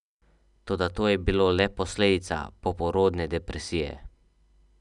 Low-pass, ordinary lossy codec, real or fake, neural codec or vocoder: 10.8 kHz; none; real; none